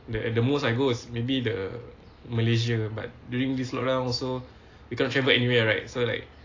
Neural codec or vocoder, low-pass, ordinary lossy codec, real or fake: none; 7.2 kHz; AAC, 32 kbps; real